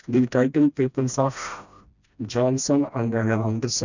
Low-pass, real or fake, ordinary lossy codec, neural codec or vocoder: 7.2 kHz; fake; none; codec, 16 kHz, 1 kbps, FreqCodec, smaller model